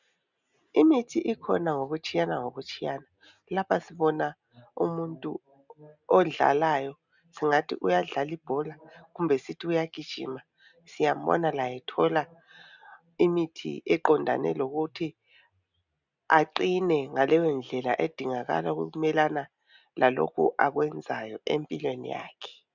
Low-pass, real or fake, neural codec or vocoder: 7.2 kHz; real; none